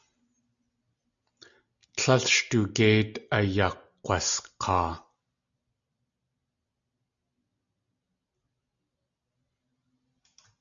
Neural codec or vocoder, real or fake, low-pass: none; real; 7.2 kHz